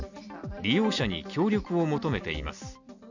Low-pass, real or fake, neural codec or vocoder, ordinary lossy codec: 7.2 kHz; real; none; none